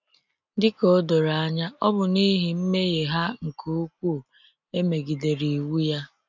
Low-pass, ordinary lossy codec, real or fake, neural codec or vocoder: 7.2 kHz; none; real; none